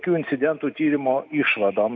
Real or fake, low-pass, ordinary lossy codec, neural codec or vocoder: real; 7.2 kHz; MP3, 64 kbps; none